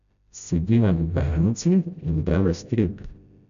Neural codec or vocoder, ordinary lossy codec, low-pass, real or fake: codec, 16 kHz, 0.5 kbps, FreqCodec, smaller model; none; 7.2 kHz; fake